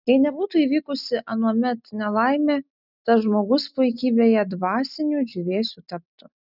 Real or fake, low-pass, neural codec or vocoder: real; 5.4 kHz; none